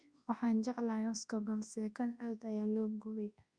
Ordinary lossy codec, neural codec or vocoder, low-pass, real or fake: Opus, 64 kbps; codec, 24 kHz, 0.9 kbps, WavTokenizer, large speech release; 9.9 kHz; fake